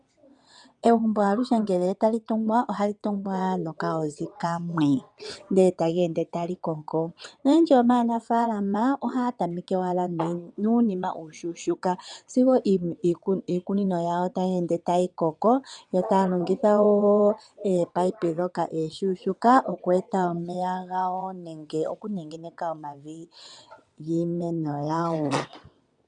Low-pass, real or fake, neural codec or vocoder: 9.9 kHz; fake; vocoder, 22.05 kHz, 80 mel bands, WaveNeXt